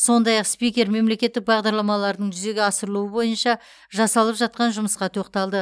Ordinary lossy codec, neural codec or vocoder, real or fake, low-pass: none; none; real; none